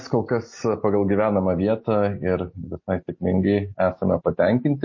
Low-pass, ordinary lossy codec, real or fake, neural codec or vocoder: 7.2 kHz; MP3, 32 kbps; real; none